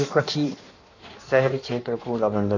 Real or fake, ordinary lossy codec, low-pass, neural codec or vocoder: fake; none; 7.2 kHz; codec, 16 kHz in and 24 kHz out, 1.1 kbps, FireRedTTS-2 codec